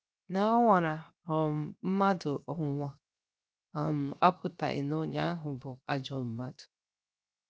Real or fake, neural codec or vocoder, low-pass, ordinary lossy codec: fake; codec, 16 kHz, 0.7 kbps, FocalCodec; none; none